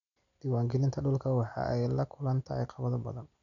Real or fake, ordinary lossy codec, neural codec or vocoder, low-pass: real; none; none; 7.2 kHz